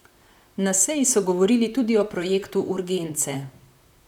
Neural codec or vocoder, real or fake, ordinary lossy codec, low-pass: vocoder, 44.1 kHz, 128 mel bands, Pupu-Vocoder; fake; none; 19.8 kHz